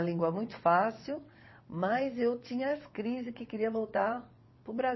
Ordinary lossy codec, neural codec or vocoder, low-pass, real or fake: MP3, 24 kbps; vocoder, 22.05 kHz, 80 mel bands, WaveNeXt; 7.2 kHz; fake